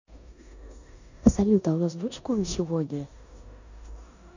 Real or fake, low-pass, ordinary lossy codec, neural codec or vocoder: fake; 7.2 kHz; none; codec, 16 kHz in and 24 kHz out, 0.9 kbps, LongCat-Audio-Codec, four codebook decoder